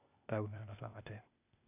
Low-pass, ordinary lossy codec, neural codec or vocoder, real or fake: 3.6 kHz; none; codec, 16 kHz, 0.8 kbps, ZipCodec; fake